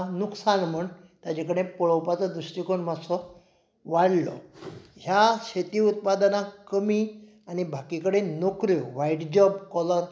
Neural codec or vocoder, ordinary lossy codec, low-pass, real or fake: none; none; none; real